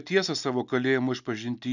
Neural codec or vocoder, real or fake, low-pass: none; real; 7.2 kHz